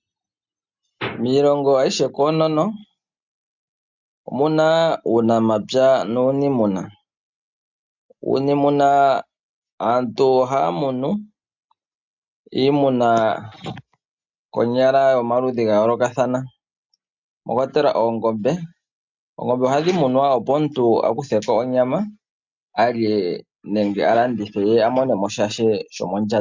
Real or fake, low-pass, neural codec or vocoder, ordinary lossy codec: real; 7.2 kHz; none; MP3, 64 kbps